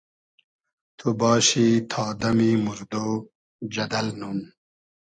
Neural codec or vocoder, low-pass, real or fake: none; 9.9 kHz; real